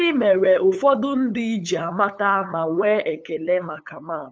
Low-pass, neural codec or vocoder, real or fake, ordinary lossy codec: none; codec, 16 kHz, 8 kbps, FunCodec, trained on LibriTTS, 25 frames a second; fake; none